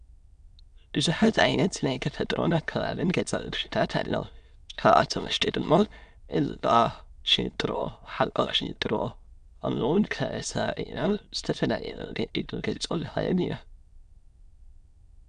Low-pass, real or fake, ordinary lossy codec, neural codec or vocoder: 9.9 kHz; fake; Opus, 64 kbps; autoencoder, 22.05 kHz, a latent of 192 numbers a frame, VITS, trained on many speakers